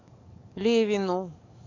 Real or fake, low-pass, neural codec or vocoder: fake; 7.2 kHz; codec, 44.1 kHz, 7.8 kbps, DAC